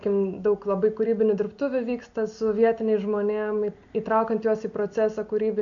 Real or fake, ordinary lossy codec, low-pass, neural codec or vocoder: real; AAC, 64 kbps; 7.2 kHz; none